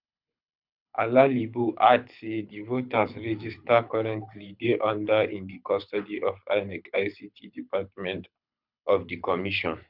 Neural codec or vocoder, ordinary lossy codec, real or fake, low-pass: codec, 24 kHz, 6 kbps, HILCodec; none; fake; 5.4 kHz